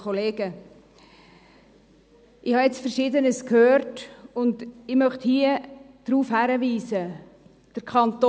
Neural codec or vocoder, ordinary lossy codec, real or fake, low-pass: none; none; real; none